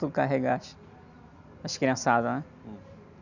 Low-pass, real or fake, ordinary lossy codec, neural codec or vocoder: 7.2 kHz; real; none; none